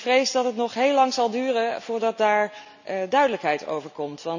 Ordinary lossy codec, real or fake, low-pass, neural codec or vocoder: none; real; 7.2 kHz; none